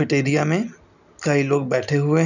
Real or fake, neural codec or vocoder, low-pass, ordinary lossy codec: real; none; 7.2 kHz; none